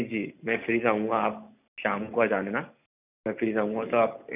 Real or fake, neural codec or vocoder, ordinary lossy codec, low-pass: real; none; none; 3.6 kHz